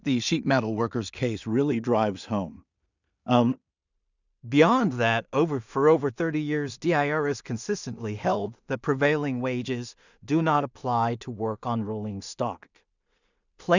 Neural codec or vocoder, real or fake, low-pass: codec, 16 kHz in and 24 kHz out, 0.4 kbps, LongCat-Audio-Codec, two codebook decoder; fake; 7.2 kHz